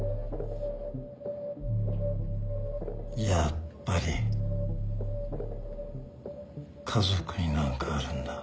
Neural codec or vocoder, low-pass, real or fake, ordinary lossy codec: none; none; real; none